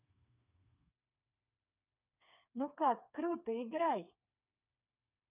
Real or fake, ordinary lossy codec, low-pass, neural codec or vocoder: fake; none; 3.6 kHz; codec, 16 kHz, 4 kbps, FreqCodec, smaller model